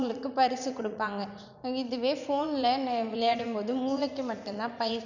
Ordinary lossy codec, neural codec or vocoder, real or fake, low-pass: none; vocoder, 44.1 kHz, 80 mel bands, Vocos; fake; 7.2 kHz